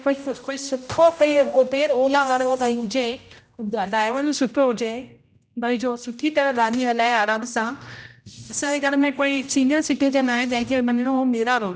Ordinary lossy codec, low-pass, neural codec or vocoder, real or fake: none; none; codec, 16 kHz, 0.5 kbps, X-Codec, HuBERT features, trained on general audio; fake